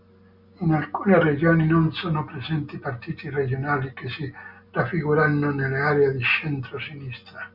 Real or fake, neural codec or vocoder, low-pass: real; none; 5.4 kHz